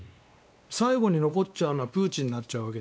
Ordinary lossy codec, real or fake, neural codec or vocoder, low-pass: none; fake; codec, 16 kHz, 2 kbps, X-Codec, WavLM features, trained on Multilingual LibriSpeech; none